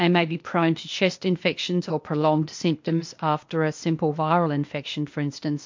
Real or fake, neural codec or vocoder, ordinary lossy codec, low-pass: fake; codec, 16 kHz, 0.8 kbps, ZipCodec; MP3, 48 kbps; 7.2 kHz